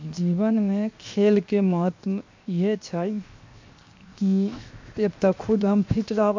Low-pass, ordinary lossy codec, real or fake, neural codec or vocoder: 7.2 kHz; MP3, 48 kbps; fake; codec, 16 kHz, 0.7 kbps, FocalCodec